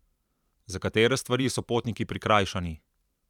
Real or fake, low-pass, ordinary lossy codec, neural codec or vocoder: fake; 19.8 kHz; none; vocoder, 44.1 kHz, 128 mel bands every 512 samples, BigVGAN v2